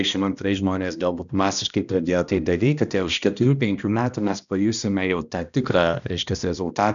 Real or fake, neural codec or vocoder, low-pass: fake; codec, 16 kHz, 1 kbps, X-Codec, HuBERT features, trained on balanced general audio; 7.2 kHz